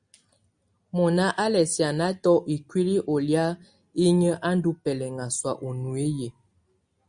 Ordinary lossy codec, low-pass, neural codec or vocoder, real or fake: Opus, 64 kbps; 9.9 kHz; none; real